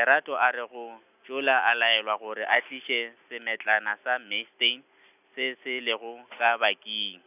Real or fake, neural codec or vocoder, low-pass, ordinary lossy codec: real; none; 3.6 kHz; none